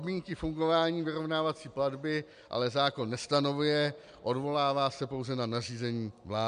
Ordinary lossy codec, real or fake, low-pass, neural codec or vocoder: AAC, 96 kbps; real; 9.9 kHz; none